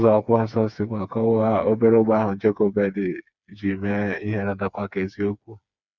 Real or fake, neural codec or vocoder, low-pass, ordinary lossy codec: fake; codec, 16 kHz, 4 kbps, FreqCodec, smaller model; 7.2 kHz; Opus, 64 kbps